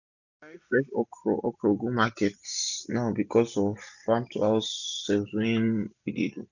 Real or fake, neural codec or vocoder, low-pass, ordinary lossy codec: real; none; 7.2 kHz; none